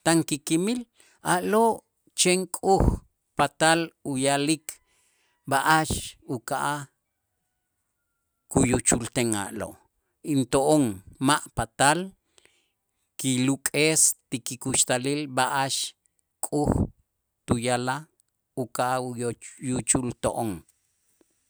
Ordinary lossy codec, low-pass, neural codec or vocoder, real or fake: none; none; none; real